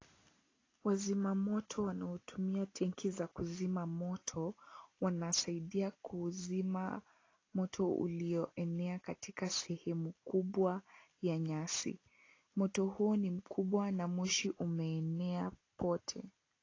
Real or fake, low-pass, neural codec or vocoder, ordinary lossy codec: real; 7.2 kHz; none; AAC, 32 kbps